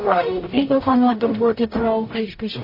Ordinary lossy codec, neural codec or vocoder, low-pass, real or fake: MP3, 24 kbps; codec, 44.1 kHz, 0.9 kbps, DAC; 5.4 kHz; fake